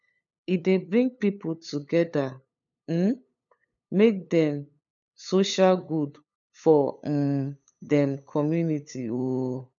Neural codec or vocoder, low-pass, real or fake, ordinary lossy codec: codec, 16 kHz, 8 kbps, FunCodec, trained on LibriTTS, 25 frames a second; 7.2 kHz; fake; none